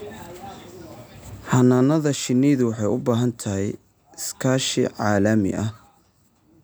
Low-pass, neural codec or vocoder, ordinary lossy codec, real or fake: none; none; none; real